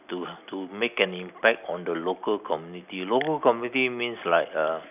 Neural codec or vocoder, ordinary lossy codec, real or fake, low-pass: none; none; real; 3.6 kHz